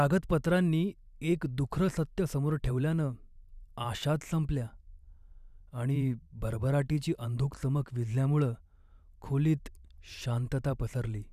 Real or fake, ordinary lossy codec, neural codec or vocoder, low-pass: fake; none; vocoder, 44.1 kHz, 128 mel bands every 512 samples, BigVGAN v2; 14.4 kHz